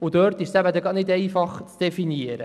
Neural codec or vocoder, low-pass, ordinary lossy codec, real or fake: none; none; none; real